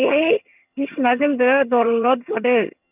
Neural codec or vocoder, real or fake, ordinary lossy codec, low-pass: vocoder, 22.05 kHz, 80 mel bands, HiFi-GAN; fake; none; 3.6 kHz